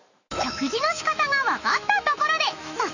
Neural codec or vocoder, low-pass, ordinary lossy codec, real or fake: autoencoder, 48 kHz, 128 numbers a frame, DAC-VAE, trained on Japanese speech; 7.2 kHz; none; fake